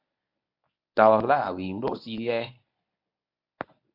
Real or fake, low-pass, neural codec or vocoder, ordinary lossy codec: fake; 5.4 kHz; codec, 24 kHz, 0.9 kbps, WavTokenizer, medium speech release version 1; MP3, 48 kbps